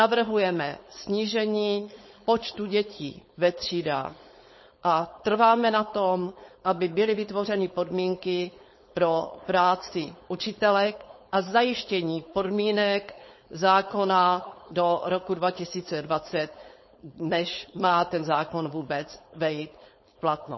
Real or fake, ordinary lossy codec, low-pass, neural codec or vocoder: fake; MP3, 24 kbps; 7.2 kHz; codec, 16 kHz, 4.8 kbps, FACodec